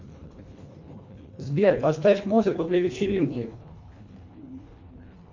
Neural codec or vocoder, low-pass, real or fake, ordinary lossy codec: codec, 24 kHz, 1.5 kbps, HILCodec; 7.2 kHz; fake; MP3, 64 kbps